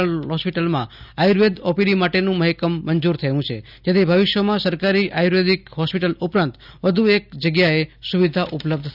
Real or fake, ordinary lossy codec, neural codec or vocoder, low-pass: real; none; none; 5.4 kHz